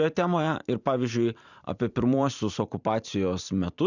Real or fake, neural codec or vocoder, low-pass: fake; vocoder, 44.1 kHz, 128 mel bands every 512 samples, BigVGAN v2; 7.2 kHz